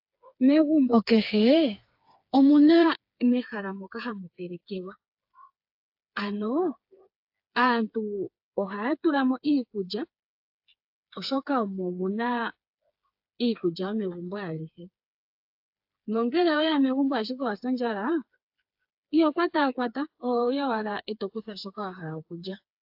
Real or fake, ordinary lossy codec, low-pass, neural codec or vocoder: fake; AAC, 48 kbps; 5.4 kHz; codec, 16 kHz, 4 kbps, FreqCodec, smaller model